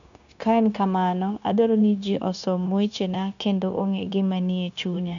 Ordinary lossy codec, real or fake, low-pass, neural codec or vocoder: none; fake; 7.2 kHz; codec, 16 kHz, 0.9 kbps, LongCat-Audio-Codec